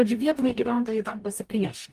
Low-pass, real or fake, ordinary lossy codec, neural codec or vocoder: 14.4 kHz; fake; Opus, 32 kbps; codec, 44.1 kHz, 0.9 kbps, DAC